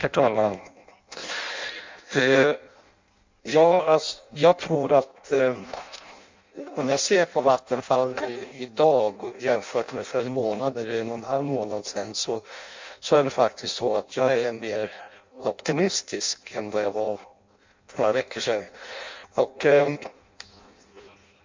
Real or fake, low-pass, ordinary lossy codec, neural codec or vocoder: fake; 7.2 kHz; MP3, 64 kbps; codec, 16 kHz in and 24 kHz out, 0.6 kbps, FireRedTTS-2 codec